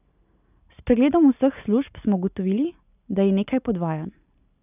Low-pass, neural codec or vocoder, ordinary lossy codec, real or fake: 3.6 kHz; none; none; real